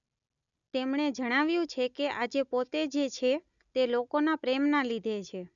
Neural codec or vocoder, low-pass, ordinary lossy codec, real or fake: none; 7.2 kHz; none; real